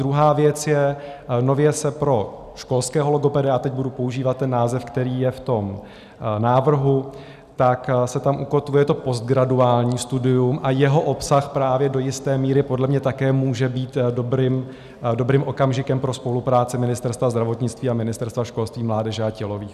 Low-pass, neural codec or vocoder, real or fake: 14.4 kHz; none; real